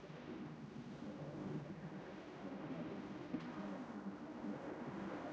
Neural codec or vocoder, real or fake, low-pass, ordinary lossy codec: codec, 16 kHz, 0.5 kbps, X-Codec, HuBERT features, trained on general audio; fake; none; none